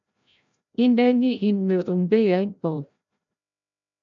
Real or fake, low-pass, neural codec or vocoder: fake; 7.2 kHz; codec, 16 kHz, 0.5 kbps, FreqCodec, larger model